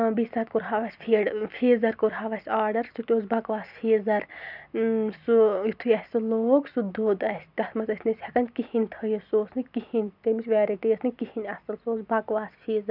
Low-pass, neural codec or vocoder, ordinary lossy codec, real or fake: 5.4 kHz; none; none; real